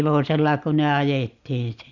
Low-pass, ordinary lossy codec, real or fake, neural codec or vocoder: 7.2 kHz; Opus, 64 kbps; real; none